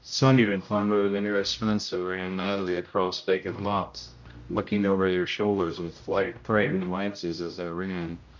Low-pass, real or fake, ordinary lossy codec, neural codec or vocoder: 7.2 kHz; fake; MP3, 48 kbps; codec, 16 kHz, 0.5 kbps, X-Codec, HuBERT features, trained on general audio